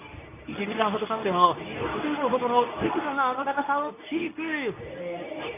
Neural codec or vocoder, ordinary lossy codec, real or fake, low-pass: codec, 24 kHz, 0.9 kbps, WavTokenizer, medium speech release version 2; none; fake; 3.6 kHz